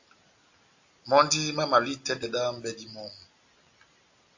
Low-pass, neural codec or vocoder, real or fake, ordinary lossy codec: 7.2 kHz; none; real; AAC, 48 kbps